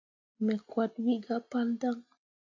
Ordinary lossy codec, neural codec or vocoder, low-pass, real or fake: AAC, 48 kbps; none; 7.2 kHz; real